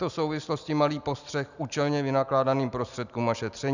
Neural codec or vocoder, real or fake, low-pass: none; real; 7.2 kHz